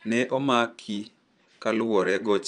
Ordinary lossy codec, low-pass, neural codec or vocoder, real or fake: none; 9.9 kHz; vocoder, 22.05 kHz, 80 mel bands, Vocos; fake